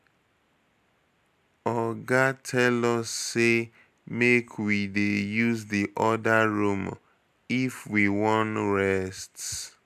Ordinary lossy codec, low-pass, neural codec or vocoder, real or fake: none; 14.4 kHz; none; real